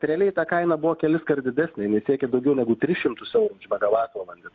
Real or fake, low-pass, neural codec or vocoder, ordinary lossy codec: real; 7.2 kHz; none; AAC, 48 kbps